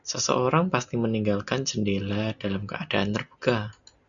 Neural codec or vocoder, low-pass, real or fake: none; 7.2 kHz; real